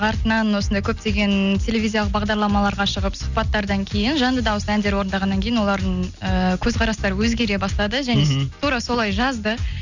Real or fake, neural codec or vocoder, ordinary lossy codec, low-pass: real; none; none; 7.2 kHz